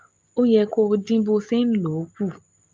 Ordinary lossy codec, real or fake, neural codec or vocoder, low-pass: Opus, 24 kbps; real; none; 7.2 kHz